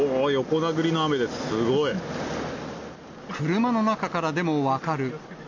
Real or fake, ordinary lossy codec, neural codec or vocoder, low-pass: real; none; none; 7.2 kHz